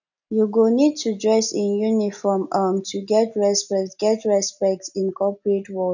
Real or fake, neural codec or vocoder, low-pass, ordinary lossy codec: real; none; 7.2 kHz; none